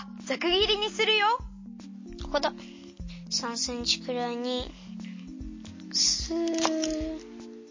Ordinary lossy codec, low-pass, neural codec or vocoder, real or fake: MP3, 32 kbps; 7.2 kHz; none; real